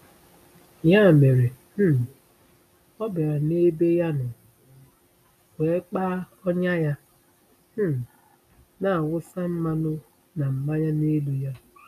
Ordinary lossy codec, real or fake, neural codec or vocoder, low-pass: none; real; none; 14.4 kHz